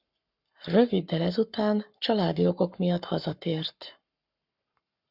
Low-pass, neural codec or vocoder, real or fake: 5.4 kHz; codec, 44.1 kHz, 7.8 kbps, Pupu-Codec; fake